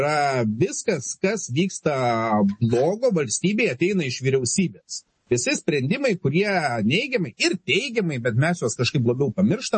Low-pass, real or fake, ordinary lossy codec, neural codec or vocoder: 10.8 kHz; real; MP3, 32 kbps; none